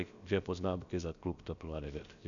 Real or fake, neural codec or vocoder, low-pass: fake; codec, 16 kHz, about 1 kbps, DyCAST, with the encoder's durations; 7.2 kHz